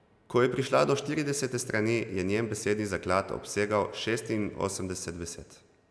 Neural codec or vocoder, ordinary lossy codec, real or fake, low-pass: none; none; real; none